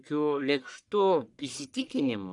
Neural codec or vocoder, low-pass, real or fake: codec, 44.1 kHz, 1.7 kbps, Pupu-Codec; 10.8 kHz; fake